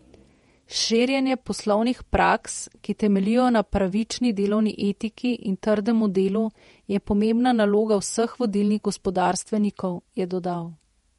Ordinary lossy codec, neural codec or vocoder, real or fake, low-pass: MP3, 48 kbps; vocoder, 48 kHz, 128 mel bands, Vocos; fake; 19.8 kHz